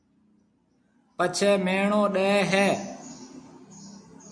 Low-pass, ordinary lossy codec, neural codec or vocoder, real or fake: 9.9 kHz; MP3, 64 kbps; none; real